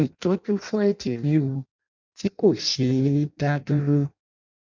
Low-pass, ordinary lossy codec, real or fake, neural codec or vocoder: 7.2 kHz; none; fake; codec, 16 kHz in and 24 kHz out, 0.6 kbps, FireRedTTS-2 codec